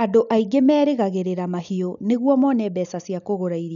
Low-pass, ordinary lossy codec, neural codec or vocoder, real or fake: 7.2 kHz; none; none; real